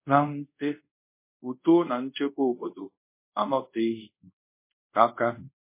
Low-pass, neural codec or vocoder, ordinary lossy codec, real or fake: 3.6 kHz; codec, 24 kHz, 0.5 kbps, DualCodec; MP3, 24 kbps; fake